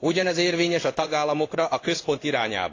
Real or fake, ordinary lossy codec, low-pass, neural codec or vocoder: fake; AAC, 32 kbps; 7.2 kHz; codec, 16 kHz in and 24 kHz out, 1 kbps, XY-Tokenizer